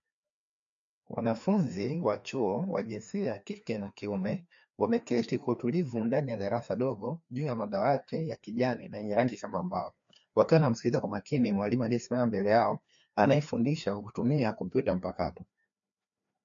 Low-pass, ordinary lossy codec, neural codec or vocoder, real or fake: 7.2 kHz; MP3, 48 kbps; codec, 16 kHz, 2 kbps, FreqCodec, larger model; fake